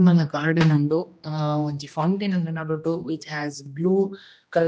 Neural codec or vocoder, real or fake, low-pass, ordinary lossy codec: codec, 16 kHz, 1 kbps, X-Codec, HuBERT features, trained on general audio; fake; none; none